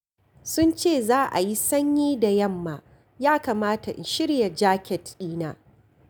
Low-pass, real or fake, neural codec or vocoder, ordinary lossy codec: none; real; none; none